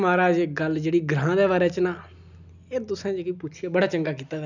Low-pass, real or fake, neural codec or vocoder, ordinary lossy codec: 7.2 kHz; real; none; none